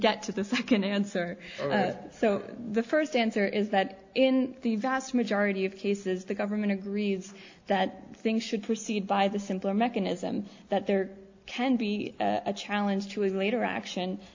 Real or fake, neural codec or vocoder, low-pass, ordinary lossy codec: real; none; 7.2 kHz; AAC, 48 kbps